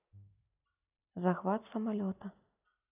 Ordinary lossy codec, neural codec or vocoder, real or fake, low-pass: none; none; real; 3.6 kHz